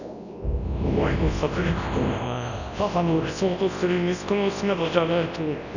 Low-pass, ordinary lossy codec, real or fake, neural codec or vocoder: 7.2 kHz; none; fake; codec, 24 kHz, 0.9 kbps, WavTokenizer, large speech release